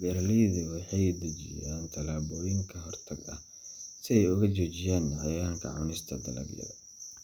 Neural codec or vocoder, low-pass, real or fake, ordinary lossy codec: none; none; real; none